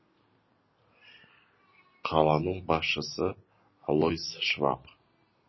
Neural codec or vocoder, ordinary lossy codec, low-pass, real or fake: none; MP3, 24 kbps; 7.2 kHz; real